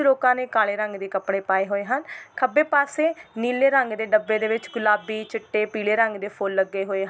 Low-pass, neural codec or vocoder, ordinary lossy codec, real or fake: none; none; none; real